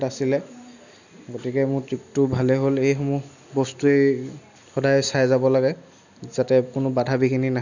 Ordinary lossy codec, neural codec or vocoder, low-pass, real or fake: none; none; 7.2 kHz; real